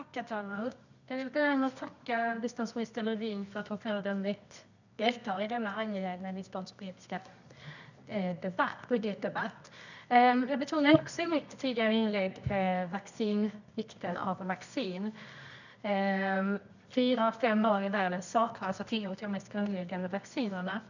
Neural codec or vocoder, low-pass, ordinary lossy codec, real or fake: codec, 24 kHz, 0.9 kbps, WavTokenizer, medium music audio release; 7.2 kHz; none; fake